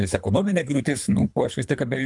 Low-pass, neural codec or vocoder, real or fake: 10.8 kHz; codec, 24 kHz, 3 kbps, HILCodec; fake